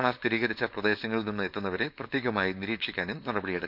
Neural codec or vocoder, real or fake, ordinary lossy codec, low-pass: codec, 16 kHz, 4.8 kbps, FACodec; fake; none; 5.4 kHz